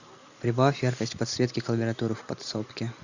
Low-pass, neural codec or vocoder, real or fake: 7.2 kHz; none; real